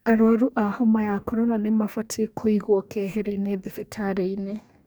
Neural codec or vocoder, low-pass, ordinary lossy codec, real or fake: codec, 44.1 kHz, 2.6 kbps, DAC; none; none; fake